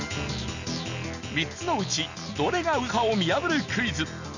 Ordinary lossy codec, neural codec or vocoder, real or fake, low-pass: none; none; real; 7.2 kHz